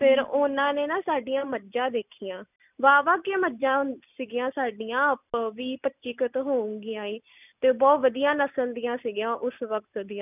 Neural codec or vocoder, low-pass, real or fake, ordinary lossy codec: none; 3.6 kHz; real; none